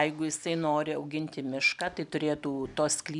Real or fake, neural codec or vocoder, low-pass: real; none; 10.8 kHz